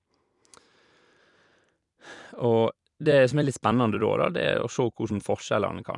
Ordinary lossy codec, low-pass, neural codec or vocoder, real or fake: none; 10.8 kHz; vocoder, 44.1 kHz, 128 mel bands every 256 samples, BigVGAN v2; fake